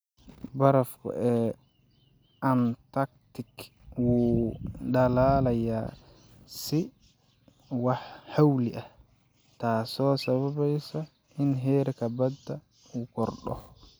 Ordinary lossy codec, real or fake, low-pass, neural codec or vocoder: none; real; none; none